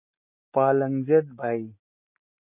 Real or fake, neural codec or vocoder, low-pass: real; none; 3.6 kHz